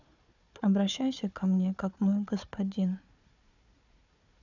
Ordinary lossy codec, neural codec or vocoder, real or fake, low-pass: none; codec, 16 kHz, 4 kbps, FunCodec, trained on Chinese and English, 50 frames a second; fake; 7.2 kHz